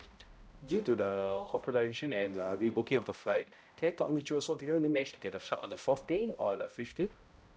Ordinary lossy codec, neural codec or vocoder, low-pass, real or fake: none; codec, 16 kHz, 0.5 kbps, X-Codec, HuBERT features, trained on balanced general audio; none; fake